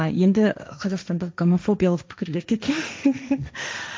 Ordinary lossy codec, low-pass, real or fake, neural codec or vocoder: none; none; fake; codec, 16 kHz, 1.1 kbps, Voila-Tokenizer